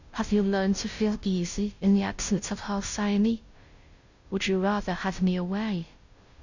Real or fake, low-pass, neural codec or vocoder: fake; 7.2 kHz; codec, 16 kHz, 0.5 kbps, FunCodec, trained on Chinese and English, 25 frames a second